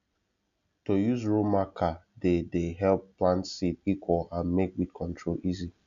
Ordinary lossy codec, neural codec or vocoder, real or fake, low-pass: none; none; real; 7.2 kHz